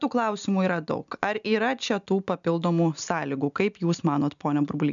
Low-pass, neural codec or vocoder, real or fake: 7.2 kHz; none; real